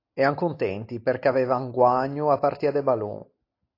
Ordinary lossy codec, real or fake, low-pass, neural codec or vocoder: AAC, 32 kbps; real; 5.4 kHz; none